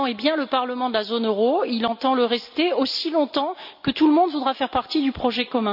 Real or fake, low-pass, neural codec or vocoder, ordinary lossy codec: real; 5.4 kHz; none; none